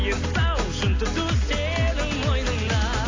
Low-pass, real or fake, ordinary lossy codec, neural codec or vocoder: 7.2 kHz; real; AAC, 32 kbps; none